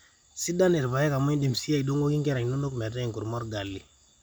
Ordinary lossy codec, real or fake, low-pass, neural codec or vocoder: none; real; none; none